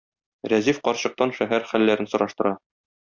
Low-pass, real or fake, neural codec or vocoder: 7.2 kHz; real; none